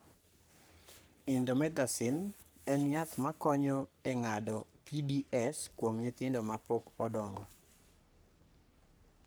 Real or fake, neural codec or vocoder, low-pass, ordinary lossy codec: fake; codec, 44.1 kHz, 3.4 kbps, Pupu-Codec; none; none